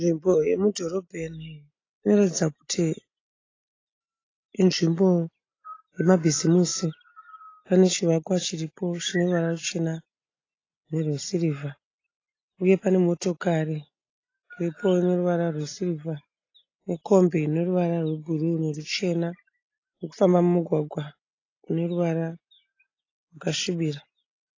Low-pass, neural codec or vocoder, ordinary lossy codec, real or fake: 7.2 kHz; none; AAC, 32 kbps; real